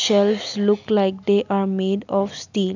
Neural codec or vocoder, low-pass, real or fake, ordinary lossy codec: none; 7.2 kHz; real; none